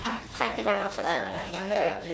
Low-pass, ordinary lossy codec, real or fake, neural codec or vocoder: none; none; fake; codec, 16 kHz, 1 kbps, FunCodec, trained on Chinese and English, 50 frames a second